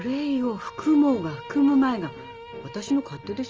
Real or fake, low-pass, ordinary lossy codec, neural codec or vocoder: real; 7.2 kHz; Opus, 24 kbps; none